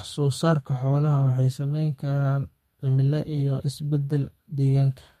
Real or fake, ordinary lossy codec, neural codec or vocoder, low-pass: fake; MP3, 64 kbps; codec, 32 kHz, 1.9 kbps, SNAC; 14.4 kHz